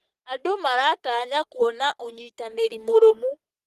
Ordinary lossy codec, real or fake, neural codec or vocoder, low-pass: Opus, 24 kbps; fake; codec, 44.1 kHz, 3.4 kbps, Pupu-Codec; 14.4 kHz